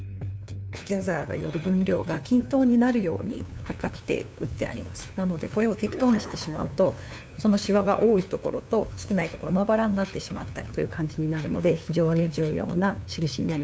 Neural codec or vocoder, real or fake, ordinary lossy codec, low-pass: codec, 16 kHz, 2 kbps, FunCodec, trained on LibriTTS, 25 frames a second; fake; none; none